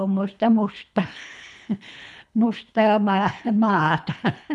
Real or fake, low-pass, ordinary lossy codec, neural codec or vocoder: fake; none; none; codec, 24 kHz, 3 kbps, HILCodec